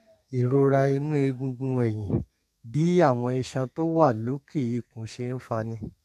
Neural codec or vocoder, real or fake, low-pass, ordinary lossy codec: codec, 32 kHz, 1.9 kbps, SNAC; fake; 14.4 kHz; none